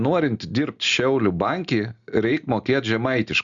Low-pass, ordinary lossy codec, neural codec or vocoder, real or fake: 7.2 kHz; Opus, 64 kbps; none; real